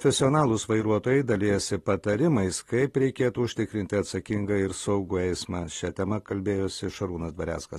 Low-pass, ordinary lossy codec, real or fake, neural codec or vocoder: 14.4 kHz; AAC, 32 kbps; real; none